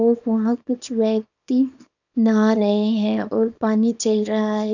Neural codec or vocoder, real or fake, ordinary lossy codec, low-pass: codec, 24 kHz, 0.9 kbps, WavTokenizer, small release; fake; none; 7.2 kHz